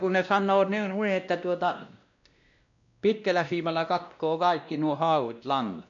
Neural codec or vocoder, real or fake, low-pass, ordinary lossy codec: codec, 16 kHz, 1 kbps, X-Codec, WavLM features, trained on Multilingual LibriSpeech; fake; 7.2 kHz; none